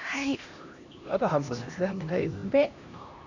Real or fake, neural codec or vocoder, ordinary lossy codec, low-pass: fake; codec, 16 kHz, 1 kbps, X-Codec, HuBERT features, trained on LibriSpeech; none; 7.2 kHz